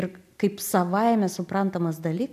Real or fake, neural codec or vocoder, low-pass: real; none; 14.4 kHz